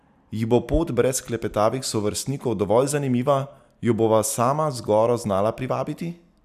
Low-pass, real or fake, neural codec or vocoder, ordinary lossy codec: 14.4 kHz; real; none; none